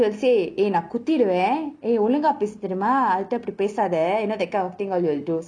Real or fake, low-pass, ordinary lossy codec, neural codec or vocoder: real; 9.9 kHz; none; none